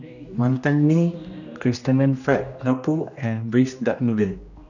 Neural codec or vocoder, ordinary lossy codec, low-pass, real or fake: codec, 16 kHz, 1 kbps, X-Codec, HuBERT features, trained on general audio; none; 7.2 kHz; fake